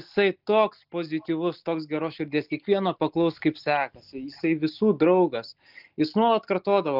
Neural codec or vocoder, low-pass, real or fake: none; 5.4 kHz; real